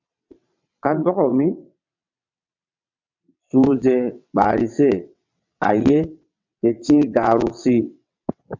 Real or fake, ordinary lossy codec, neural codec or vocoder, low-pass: fake; MP3, 64 kbps; vocoder, 22.05 kHz, 80 mel bands, WaveNeXt; 7.2 kHz